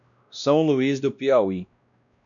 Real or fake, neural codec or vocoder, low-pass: fake; codec, 16 kHz, 1 kbps, X-Codec, WavLM features, trained on Multilingual LibriSpeech; 7.2 kHz